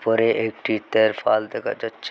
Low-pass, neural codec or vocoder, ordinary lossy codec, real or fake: none; none; none; real